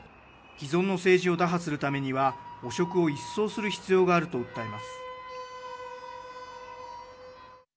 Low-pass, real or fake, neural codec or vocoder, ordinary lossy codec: none; real; none; none